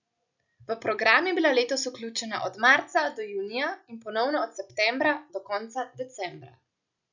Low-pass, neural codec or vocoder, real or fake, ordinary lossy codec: 7.2 kHz; none; real; none